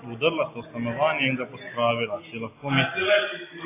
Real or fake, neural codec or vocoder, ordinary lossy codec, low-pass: real; none; AAC, 24 kbps; 3.6 kHz